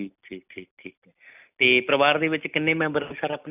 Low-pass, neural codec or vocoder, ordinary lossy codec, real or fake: 3.6 kHz; none; none; real